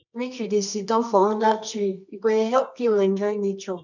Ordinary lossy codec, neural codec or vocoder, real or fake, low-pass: none; codec, 24 kHz, 0.9 kbps, WavTokenizer, medium music audio release; fake; 7.2 kHz